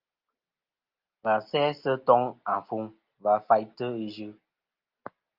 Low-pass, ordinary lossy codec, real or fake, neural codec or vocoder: 5.4 kHz; Opus, 24 kbps; real; none